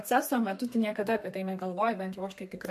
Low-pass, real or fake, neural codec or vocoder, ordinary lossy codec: 14.4 kHz; fake; codec, 32 kHz, 1.9 kbps, SNAC; MP3, 64 kbps